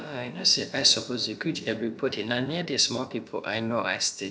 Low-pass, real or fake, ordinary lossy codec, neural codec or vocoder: none; fake; none; codec, 16 kHz, about 1 kbps, DyCAST, with the encoder's durations